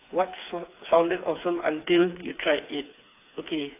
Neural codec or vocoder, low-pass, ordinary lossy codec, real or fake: codec, 16 kHz, 4 kbps, FreqCodec, smaller model; 3.6 kHz; AAC, 24 kbps; fake